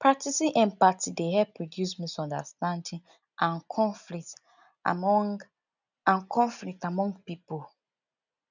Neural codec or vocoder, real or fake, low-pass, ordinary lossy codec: none; real; 7.2 kHz; none